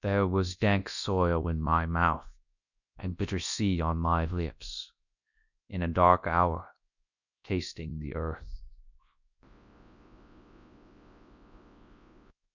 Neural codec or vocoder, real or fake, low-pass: codec, 24 kHz, 0.9 kbps, WavTokenizer, large speech release; fake; 7.2 kHz